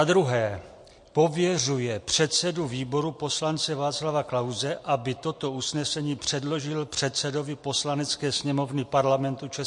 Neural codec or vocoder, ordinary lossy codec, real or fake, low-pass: none; MP3, 48 kbps; real; 10.8 kHz